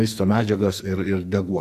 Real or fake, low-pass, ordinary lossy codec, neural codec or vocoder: fake; 14.4 kHz; Opus, 64 kbps; codec, 44.1 kHz, 2.6 kbps, SNAC